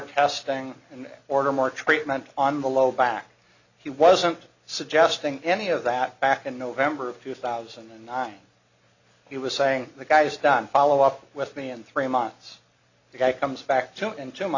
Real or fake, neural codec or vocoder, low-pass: real; none; 7.2 kHz